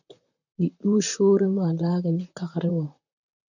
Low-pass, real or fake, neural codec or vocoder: 7.2 kHz; fake; vocoder, 44.1 kHz, 128 mel bands, Pupu-Vocoder